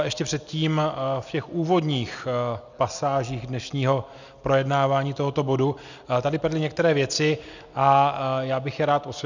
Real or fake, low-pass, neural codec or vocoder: real; 7.2 kHz; none